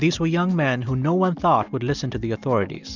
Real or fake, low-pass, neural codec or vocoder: real; 7.2 kHz; none